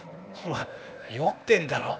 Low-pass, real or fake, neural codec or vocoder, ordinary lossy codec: none; fake; codec, 16 kHz, 0.8 kbps, ZipCodec; none